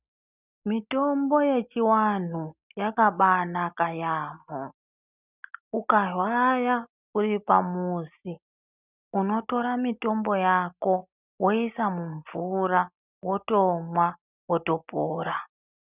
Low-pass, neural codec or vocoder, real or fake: 3.6 kHz; none; real